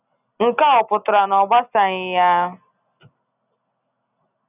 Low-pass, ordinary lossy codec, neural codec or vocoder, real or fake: 3.6 kHz; none; none; real